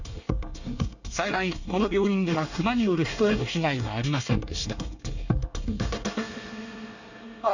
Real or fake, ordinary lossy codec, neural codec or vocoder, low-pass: fake; none; codec, 24 kHz, 1 kbps, SNAC; 7.2 kHz